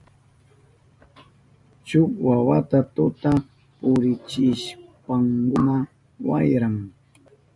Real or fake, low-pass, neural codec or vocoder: real; 10.8 kHz; none